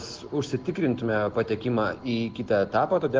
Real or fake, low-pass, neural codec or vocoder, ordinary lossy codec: real; 7.2 kHz; none; Opus, 16 kbps